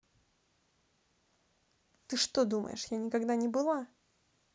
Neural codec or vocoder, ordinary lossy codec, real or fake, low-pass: none; none; real; none